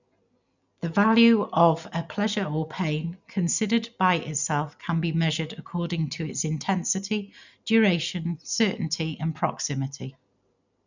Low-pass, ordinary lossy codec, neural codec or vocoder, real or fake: 7.2 kHz; none; none; real